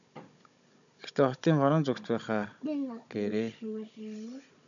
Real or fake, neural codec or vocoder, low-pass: fake; codec, 16 kHz, 16 kbps, FunCodec, trained on Chinese and English, 50 frames a second; 7.2 kHz